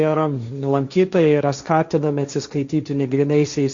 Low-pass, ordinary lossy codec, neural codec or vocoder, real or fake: 7.2 kHz; Opus, 64 kbps; codec, 16 kHz, 1.1 kbps, Voila-Tokenizer; fake